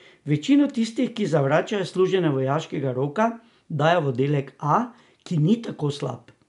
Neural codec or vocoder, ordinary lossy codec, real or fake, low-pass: none; none; real; 10.8 kHz